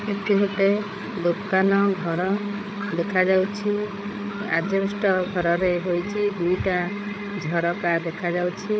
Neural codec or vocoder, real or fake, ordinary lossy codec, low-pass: codec, 16 kHz, 8 kbps, FreqCodec, larger model; fake; none; none